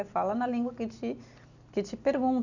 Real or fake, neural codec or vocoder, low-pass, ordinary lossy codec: real; none; 7.2 kHz; none